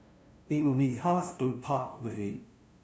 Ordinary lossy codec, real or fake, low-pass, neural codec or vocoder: none; fake; none; codec, 16 kHz, 0.5 kbps, FunCodec, trained on LibriTTS, 25 frames a second